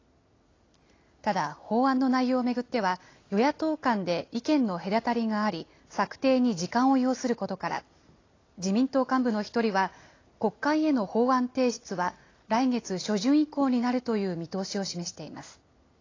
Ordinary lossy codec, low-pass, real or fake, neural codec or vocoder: AAC, 32 kbps; 7.2 kHz; real; none